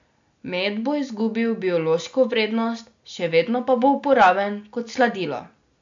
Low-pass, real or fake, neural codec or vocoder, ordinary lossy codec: 7.2 kHz; real; none; AAC, 64 kbps